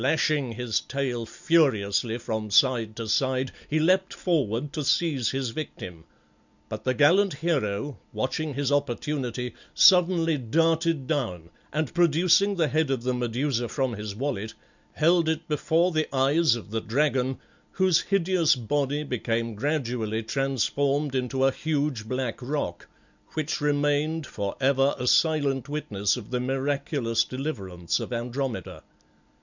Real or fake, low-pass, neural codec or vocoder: real; 7.2 kHz; none